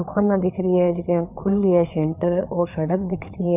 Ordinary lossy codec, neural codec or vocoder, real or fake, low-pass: none; codec, 16 kHz, 4 kbps, FreqCodec, larger model; fake; 3.6 kHz